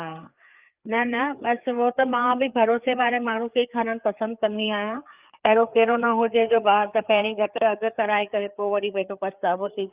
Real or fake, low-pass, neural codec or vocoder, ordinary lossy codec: fake; 3.6 kHz; codec, 16 kHz, 4 kbps, FreqCodec, larger model; Opus, 24 kbps